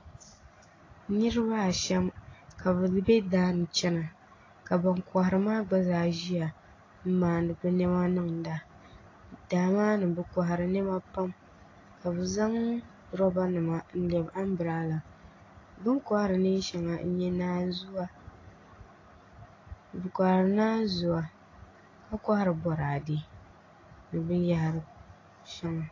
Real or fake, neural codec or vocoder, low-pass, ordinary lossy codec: real; none; 7.2 kHz; AAC, 32 kbps